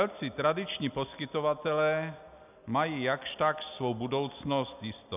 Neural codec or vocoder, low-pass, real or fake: none; 3.6 kHz; real